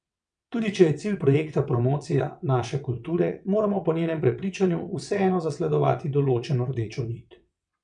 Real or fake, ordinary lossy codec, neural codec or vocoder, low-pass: fake; MP3, 96 kbps; vocoder, 22.05 kHz, 80 mel bands, WaveNeXt; 9.9 kHz